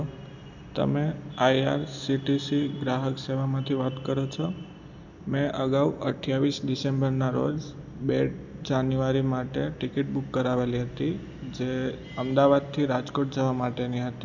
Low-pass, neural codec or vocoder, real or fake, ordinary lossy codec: 7.2 kHz; none; real; none